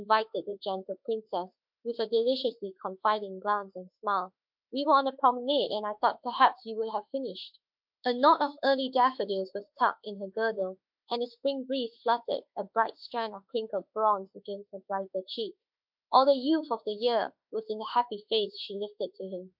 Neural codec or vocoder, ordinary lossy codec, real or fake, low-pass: autoencoder, 48 kHz, 32 numbers a frame, DAC-VAE, trained on Japanese speech; MP3, 48 kbps; fake; 5.4 kHz